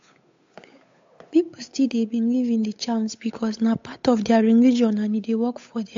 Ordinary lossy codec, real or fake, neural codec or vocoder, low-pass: AAC, 48 kbps; fake; codec, 16 kHz, 8 kbps, FunCodec, trained on Chinese and English, 25 frames a second; 7.2 kHz